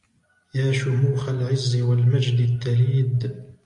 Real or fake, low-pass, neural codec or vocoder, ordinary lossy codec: real; 10.8 kHz; none; AAC, 48 kbps